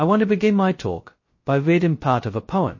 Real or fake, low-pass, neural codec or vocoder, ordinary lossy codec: fake; 7.2 kHz; codec, 16 kHz, 0.2 kbps, FocalCodec; MP3, 32 kbps